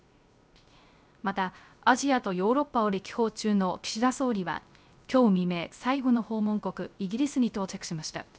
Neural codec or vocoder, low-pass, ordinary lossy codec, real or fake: codec, 16 kHz, 0.3 kbps, FocalCodec; none; none; fake